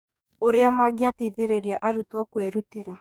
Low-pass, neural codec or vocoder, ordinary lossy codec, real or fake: none; codec, 44.1 kHz, 2.6 kbps, DAC; none; fake